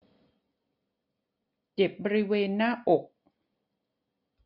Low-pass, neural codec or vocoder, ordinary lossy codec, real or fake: 5.4 kHz; none; none; real